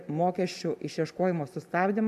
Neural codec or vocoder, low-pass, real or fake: none; 14.4 kHz; real